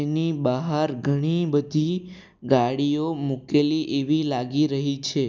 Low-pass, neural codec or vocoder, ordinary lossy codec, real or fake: none; none; none; real